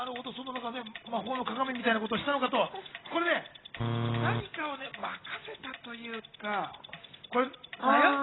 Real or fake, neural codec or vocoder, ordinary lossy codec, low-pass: real; none; AAC, 16 kbps; 7.2 kHz